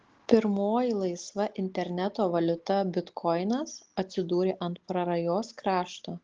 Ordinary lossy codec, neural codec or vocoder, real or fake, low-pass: Opus, 16 kbps; none; real; 7.2 kHz